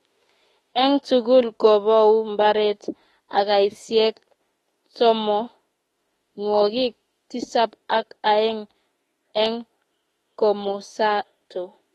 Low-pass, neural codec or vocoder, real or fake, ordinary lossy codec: 19.8 kHz; autoencoder, 48 kHz, 32 numbers a frame, DAC-VAE, trained on Japanese speech; fake; AAC, 32 kbps